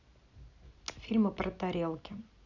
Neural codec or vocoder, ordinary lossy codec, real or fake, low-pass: none; none; real; 7.2 kHz